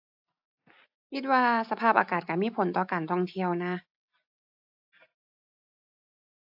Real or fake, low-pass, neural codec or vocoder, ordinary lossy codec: real; 5.4 kHz; none; AAC, 48 kbps